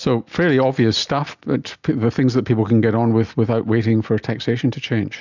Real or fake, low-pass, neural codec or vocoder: real; 7.2 kHz; none